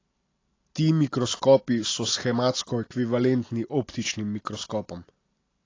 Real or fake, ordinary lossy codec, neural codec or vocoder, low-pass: real; AAC, 32 kbps; none; 7.2 kHz